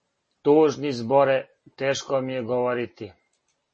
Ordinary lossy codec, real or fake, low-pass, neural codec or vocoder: MP3, 32 kbps; real; 9.9 kHz; none